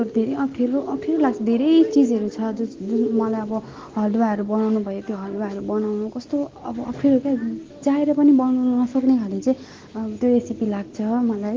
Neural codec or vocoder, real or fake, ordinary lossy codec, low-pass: none; real; Opus, 16 kbps; 7.2 kHz